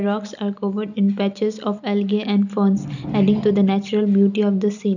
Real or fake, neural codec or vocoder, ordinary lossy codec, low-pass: real; none; none; 7.2 kHz